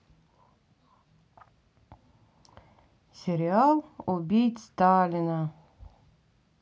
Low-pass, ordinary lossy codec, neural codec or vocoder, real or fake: none; none; none; real